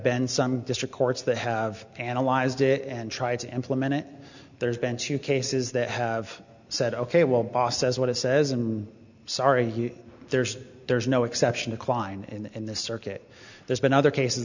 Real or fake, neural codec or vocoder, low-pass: real; none; 7.2 kHz